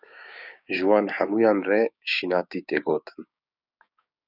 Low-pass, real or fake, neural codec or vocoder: 5.4 kHz; fake; codec, 16 kHz, 6 kbps, DAC